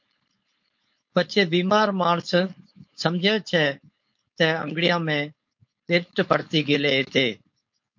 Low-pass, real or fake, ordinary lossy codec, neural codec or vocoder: 7.2 kHz; fake; MP3, 48 kbps; codec, 16 kHz, 4.8 kbps, FACodec